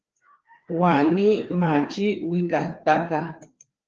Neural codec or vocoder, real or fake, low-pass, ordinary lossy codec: codec, 16 kHz, 2 kbps, FreqCodec, larger model; fake; 7.2 kHz; Opus, 16 kbps